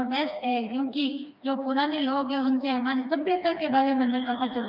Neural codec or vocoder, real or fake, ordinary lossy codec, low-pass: codec, 16 kHz, 2 kbps, FreqCodec, smaller model; fake; none; 5.4 kHz